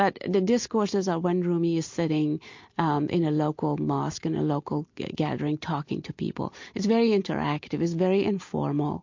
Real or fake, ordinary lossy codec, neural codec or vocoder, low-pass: real; MP3, 48 kbps; none; 7.2 kHz